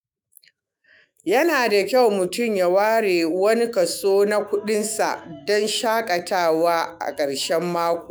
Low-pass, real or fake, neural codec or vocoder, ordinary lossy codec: none; fake; autoencoder, 48 kHz, 128 numbers a frame, DAC-VAE, trained on Japanese speech; none